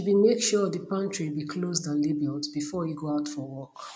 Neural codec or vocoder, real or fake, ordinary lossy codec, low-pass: none; real; none; none